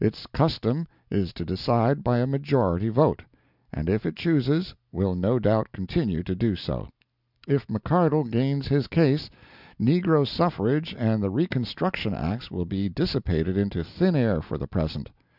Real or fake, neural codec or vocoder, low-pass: real; none; 5.4 kHz